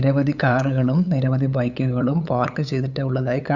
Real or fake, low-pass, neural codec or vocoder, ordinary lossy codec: fake; 7.2 kHz; codec, 16 kHz, 8 kbps, FunCodec, trained on LibriTTS, 25 frames a second; none